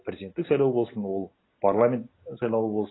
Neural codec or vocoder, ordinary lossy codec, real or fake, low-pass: none; AAC, 16 kbps; real; 7.2 kHz